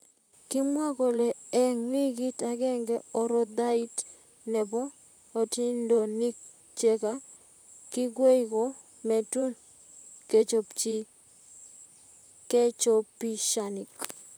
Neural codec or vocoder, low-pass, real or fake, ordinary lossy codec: vocoder, 44.1 kHz, 128 mel bands, Pupu-Vocoder; none; fake; none